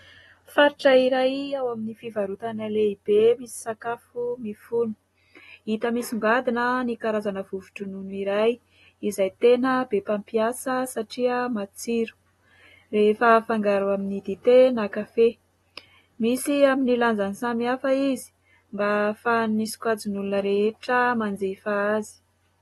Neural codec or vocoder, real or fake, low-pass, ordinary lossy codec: none; real; 19.8 kHz; AAC, 32 kbps